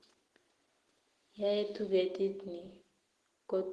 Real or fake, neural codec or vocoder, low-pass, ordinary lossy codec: real; none; 10.8 kHz; Opus, 16 kbps